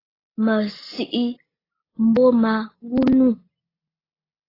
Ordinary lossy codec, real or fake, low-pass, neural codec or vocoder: AAC, 24 kbps; real; 5.4 kHz; none